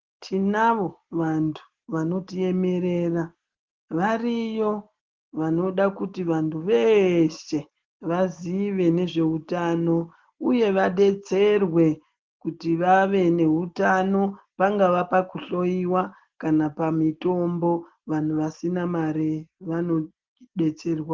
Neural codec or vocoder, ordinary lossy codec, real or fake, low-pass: none; Opus, 16 kbps; real; 7.2 kHz